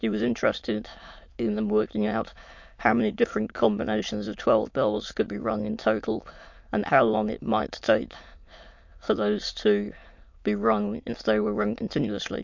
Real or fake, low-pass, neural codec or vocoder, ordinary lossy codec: fake; 7.2 kHz; autoencoder, 22.05 kHz, a latent of 192 numbers a frame, VITS, trained on many speakers; MP3, 48 kbps